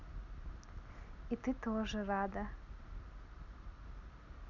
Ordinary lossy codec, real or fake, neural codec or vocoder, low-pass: none; real; none; 7.2 kHz